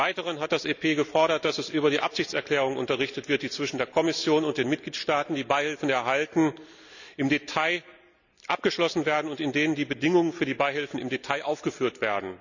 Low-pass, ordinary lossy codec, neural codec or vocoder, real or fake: 7.2 kHz; none; none; real